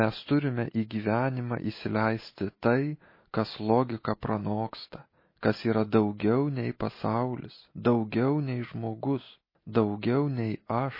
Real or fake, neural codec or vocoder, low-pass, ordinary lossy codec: real; none; 5.4 kHz; MP3, 24 kbps